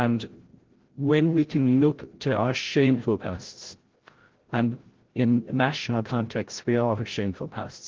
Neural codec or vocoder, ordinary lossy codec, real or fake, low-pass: codec, 16 kHz, 0.5 kbps, FreqCodec, larger model; Opus, 16 kbps; fake; 7.2 kHz